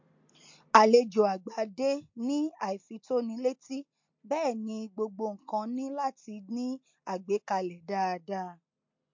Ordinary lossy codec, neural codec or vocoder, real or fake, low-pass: MP3, 48 kbps; none; real; 7.2 kHz